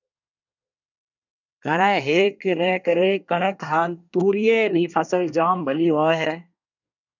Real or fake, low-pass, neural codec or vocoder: fake; 7.2 kHz; codec, 24 kHz, 1 kbps, SNAC